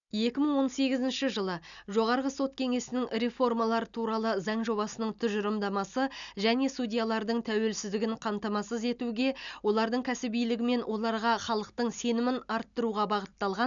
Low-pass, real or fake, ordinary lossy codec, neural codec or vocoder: 7.2 kHz; real; none; none